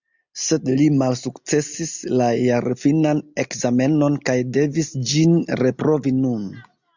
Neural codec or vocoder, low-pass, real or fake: none; 7.2 kHz; real